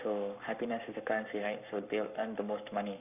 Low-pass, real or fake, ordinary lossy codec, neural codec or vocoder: 3.6 kHz; fake; none; codec, 44.1 kHz, 7.8 kbps, Pupu-Codec